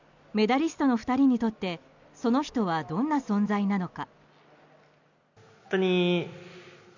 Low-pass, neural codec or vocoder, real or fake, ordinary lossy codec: 7.2 kHz; none; real; none